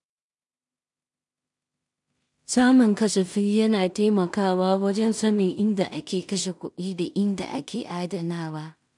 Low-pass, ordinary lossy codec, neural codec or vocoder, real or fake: 10.8 kHz; MP3, 96 kbps; codec, 16 kHz in and 24 kHz out, 0.4 kbps, LongCat-Audio-Codec, two codebook decoder; fake